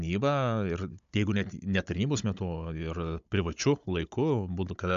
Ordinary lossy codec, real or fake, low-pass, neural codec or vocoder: MP3, 64 kbps; fake; 7.2 kHz; codec, 16 kHz, 16 kbps, FunCodec, trained on Chinese and English, 50 frames a second